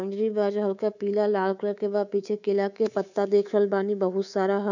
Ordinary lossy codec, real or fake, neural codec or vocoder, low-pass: none; fake; codec, 24 kHz, 3.1 kbps, DualCodec; 7.2 kHz